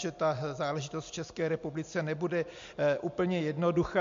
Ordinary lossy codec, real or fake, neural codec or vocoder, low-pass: MP3, 48 kbps; real; none; 7.2 kHz